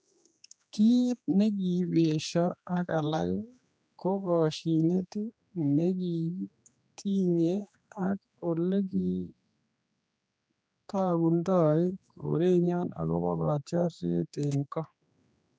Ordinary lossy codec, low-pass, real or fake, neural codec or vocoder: none; none; fake; codec, 16 kHz, 2 kbps, X-Codec, HuBERT features, trained on general audio